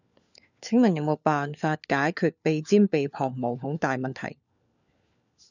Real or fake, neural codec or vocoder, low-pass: fake; codec, 16 kHz, 4 kbps, FunCodec, trained on LibriTTS, 50 frames a second; 7.2 kHz